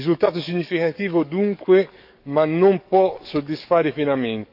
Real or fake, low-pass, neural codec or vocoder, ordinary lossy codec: fake; 5.4 kHz; codec, 44.1 kHz, 7.8 kbps, DAC; none